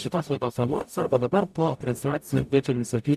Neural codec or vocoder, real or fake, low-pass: codec, 44.1 kHz, 0.9 kbps, DAC; fake; 14.4 kHz